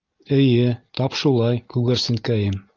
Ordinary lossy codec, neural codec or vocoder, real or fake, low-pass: Opus, 24 kbps; none; real; 7.2 kHz